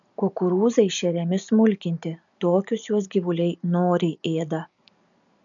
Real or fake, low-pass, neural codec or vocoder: real; 7.2 kHz; none